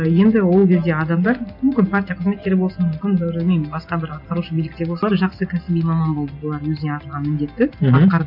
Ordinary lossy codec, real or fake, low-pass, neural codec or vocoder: none; real; 5.4 kHz; none